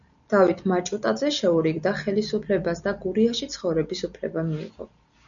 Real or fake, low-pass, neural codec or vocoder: real; 7.2 kHz; none